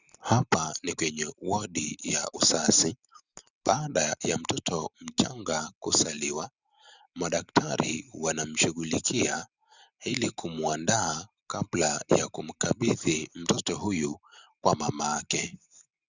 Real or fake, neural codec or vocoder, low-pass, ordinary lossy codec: real; none; 7.2 kHz; Opus, 64 kbps